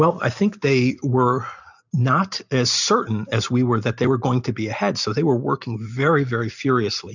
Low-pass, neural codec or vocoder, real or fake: 7.2 kHz; none; real